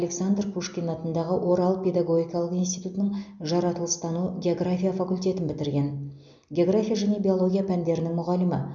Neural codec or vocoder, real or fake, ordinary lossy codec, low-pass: none; real; none; 7.2 kHz